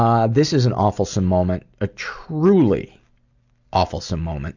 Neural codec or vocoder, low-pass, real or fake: none; 7.2 kHz; real